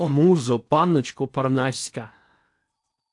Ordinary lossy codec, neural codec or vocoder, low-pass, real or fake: AAC, 64 kbps; codec, 16 kHz in and 24 kHz out, 0.8 kbps, FocalCodec, streaming, 65536 codes; 10.8 kHz; fake